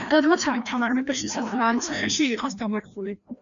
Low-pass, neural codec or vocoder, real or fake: 7.2 kHz; codec, 16 kHz, 1 kbps, FreqCodec, larger model; fake